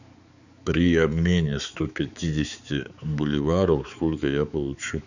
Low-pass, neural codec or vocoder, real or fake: 7.2 kHz; codec, 16 kHz, 4 kbps, X-Codec, HuBERT features, trained on balanced general audio; fake